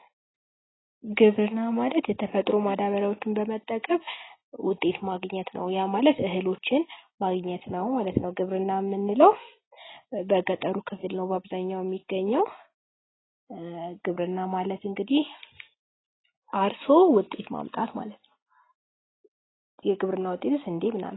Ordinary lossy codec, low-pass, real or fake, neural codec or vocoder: AAC, 16 kbps; 7.2 kHz; real; none